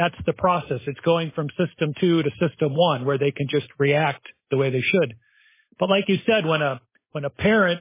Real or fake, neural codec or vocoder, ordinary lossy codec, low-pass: real; none; MP3, 16 kbps; 3.6 kHz